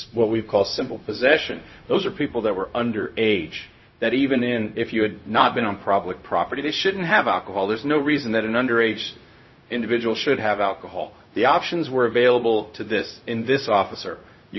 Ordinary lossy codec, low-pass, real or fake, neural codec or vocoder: MP3, 24 kbps; 7.2 kHz; fake; codec, 16 kHz, 0.4 kbps, LongCat-Audio-Codec